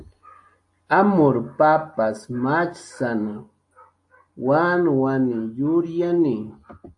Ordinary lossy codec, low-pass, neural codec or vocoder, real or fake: AAC, 64 kbps; 10.8 kHz; none; real